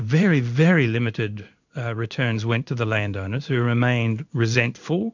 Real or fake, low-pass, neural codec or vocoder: fake; 7.2 kHz; codec, 16 kHz in and 24 kHz out, 1 kbps, XY-Tokenizer